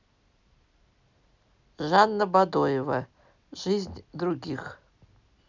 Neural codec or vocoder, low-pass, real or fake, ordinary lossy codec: none; 7.2 kHz; real; none